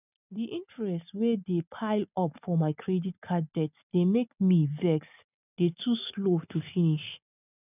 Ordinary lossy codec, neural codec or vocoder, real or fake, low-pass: none; none; real; 3.6 kHz